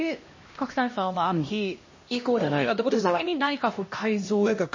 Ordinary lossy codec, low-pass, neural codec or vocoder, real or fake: MP3, 32 kbps; 7.2 kHz; codec, 16 kHz, 1 kbps, X-Codec, HuBERT features, trained on LibriSpeech; fake